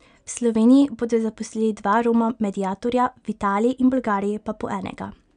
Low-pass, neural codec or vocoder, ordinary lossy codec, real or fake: 9.9 kHz; none; none; real